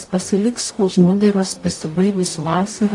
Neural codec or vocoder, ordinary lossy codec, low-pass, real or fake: codec, 44.1 kHz, 0.9 kbps, DAC; AAC, 48 kbps; 10.8 kHz; fake